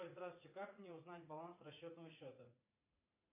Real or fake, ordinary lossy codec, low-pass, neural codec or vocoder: fake; MP3, 24 kbps; 3.6 kHz; vocoder, 44.1 kHz, 80 mel bands, Vocos